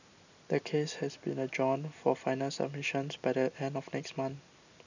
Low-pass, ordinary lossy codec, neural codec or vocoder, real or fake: 7.2 kHz; none; none; real